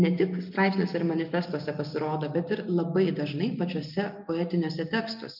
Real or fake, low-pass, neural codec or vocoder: real; 5.4 kHz; none